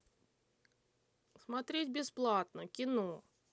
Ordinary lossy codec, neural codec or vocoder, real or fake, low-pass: none; none; real; none